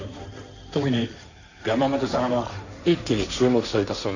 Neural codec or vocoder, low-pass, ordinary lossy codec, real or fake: codec, 16 kHz, 1.1 kbps, Voila-Tokenizer; 7.2 kHz; none; fake